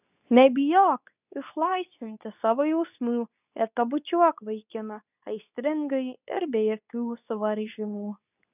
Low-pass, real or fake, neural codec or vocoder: 3.6 kHz; fake; codec, 24 kHz, 0.9 kbps, WavTokenizer, medium speech release version 2